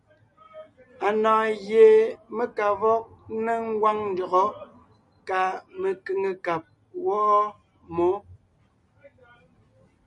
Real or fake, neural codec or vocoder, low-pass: real; none; 10.8 kHz